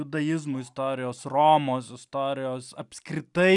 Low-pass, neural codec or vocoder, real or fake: 10.8 kHz; none; real